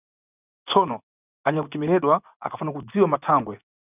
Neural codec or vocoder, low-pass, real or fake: vocoder, 24 kHz, 100 mel bands, Vocos; 3.6 kHz; fake